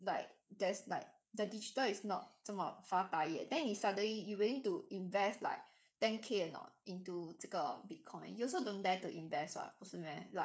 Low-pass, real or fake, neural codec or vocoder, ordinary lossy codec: none; fake; codec, 16 kHz, 8 kbps, FreqCodec, smaller model; none